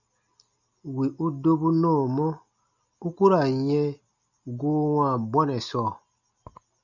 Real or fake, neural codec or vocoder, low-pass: real; none; 7.2 kHz